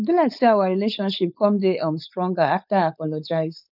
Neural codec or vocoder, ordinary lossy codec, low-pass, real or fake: codec, 16 kHz, 4.8 kbps, FACodec; none; 5.4 kHz; fake